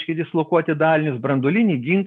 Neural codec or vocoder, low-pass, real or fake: none; 10.8 kHz; real